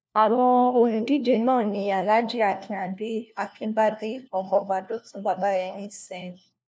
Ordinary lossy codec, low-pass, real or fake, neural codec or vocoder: none; none; fake; codec, 16 kHz, 1 kbps, FunCodec, trained on LibriTTS, 50 frames a second